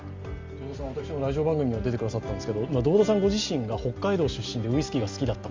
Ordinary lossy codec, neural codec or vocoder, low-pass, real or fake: Opus, 32 kbps; none; 7.2 kHz; real